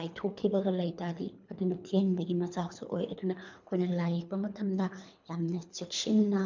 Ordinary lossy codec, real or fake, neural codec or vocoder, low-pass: MP3, 64 kbps; fake; codec, 24 kHz, 3 kbps, HILCodec; 7.2 kHz